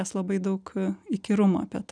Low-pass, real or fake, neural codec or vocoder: 9.9 kHz; real; none